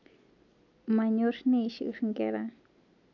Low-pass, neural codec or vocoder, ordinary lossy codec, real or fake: 7.2 kHz; none; none; real